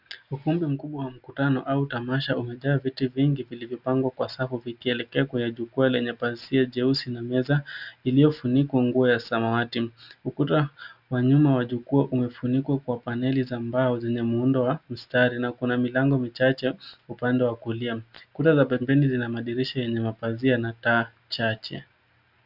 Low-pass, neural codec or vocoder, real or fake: 5.4 kHz; none; real